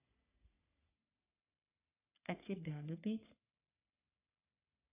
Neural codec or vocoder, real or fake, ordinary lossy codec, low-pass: codec, 44.1 kHz, 3.4 kbps, Pupu-Codec; fake; AAC, 24 kbps; 3.6 kHz